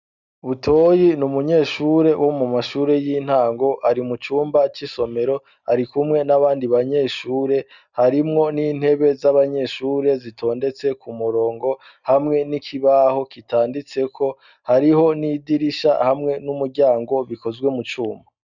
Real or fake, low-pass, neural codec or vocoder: real; 7.2 kHz; none